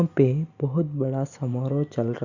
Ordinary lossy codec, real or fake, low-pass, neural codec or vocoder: none; real; 7.2 kHz; none